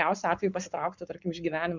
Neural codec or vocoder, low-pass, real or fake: codec, 44.1 kHz, 7.8 kbps, Pupu-Codec; 7.2 kHz; fake